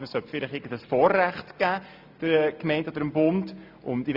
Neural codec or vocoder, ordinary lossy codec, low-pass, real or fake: none; MP3, 48 kbps; 5.4 kHz; real